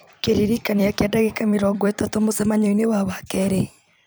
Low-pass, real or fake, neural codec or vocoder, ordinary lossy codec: none; fake; vocoder, 44.1 kHz, 128 mel bands every 256 samples, BigVGAN v2; none